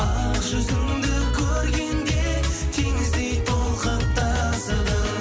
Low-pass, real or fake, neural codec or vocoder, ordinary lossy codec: none; real; none; none